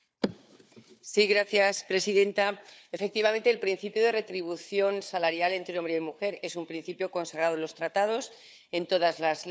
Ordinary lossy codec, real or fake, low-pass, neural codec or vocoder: none; fake; none; codec, 16 kHz, 4 kbps, FunCodec, trained on Chinese and English, 50 frames a second